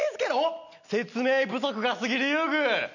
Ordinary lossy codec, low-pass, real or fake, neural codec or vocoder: none; 7.2 kHz; real; none